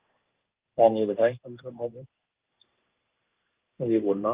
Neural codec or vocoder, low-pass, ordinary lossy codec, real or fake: codec, 16 kHz in and 24 kHz out, 1 kbps, XY-Tokenizer; 3.6 kHz; Opus, 32 kbps; fake